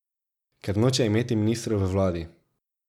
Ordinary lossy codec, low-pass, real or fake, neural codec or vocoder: none; 19.8 kHz; real; none